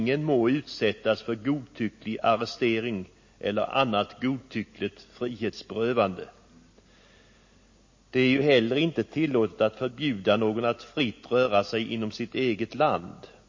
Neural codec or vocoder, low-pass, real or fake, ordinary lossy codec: none; 7.2 kHz; real; MP3, 32 kbps